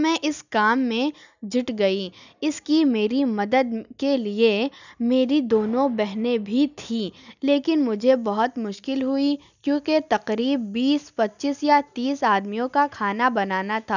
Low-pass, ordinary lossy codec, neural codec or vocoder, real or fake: 7.2 kHz; none; none; real